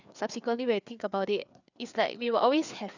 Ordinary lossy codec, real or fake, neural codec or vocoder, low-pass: none; fake; codec, 16 kHz, 4 kbps, FreqCodec, larger model; 7.2 kHz